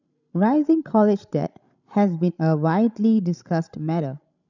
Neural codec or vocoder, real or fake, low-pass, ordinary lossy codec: codec, 16 kHz, 16 kbps, FreqCodec, larger model; fake; 7.2 kHz; none